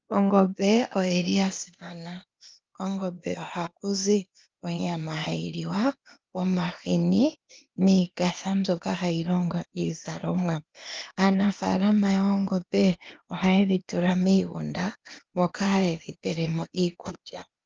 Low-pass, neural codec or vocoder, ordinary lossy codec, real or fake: 7.2 kHz; codec, 16 kHz, 0.8 kbps, ZipCodec; Opus, 24 kbps; fake